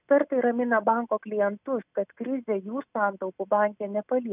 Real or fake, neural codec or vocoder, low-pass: real; none; 3.6 kHz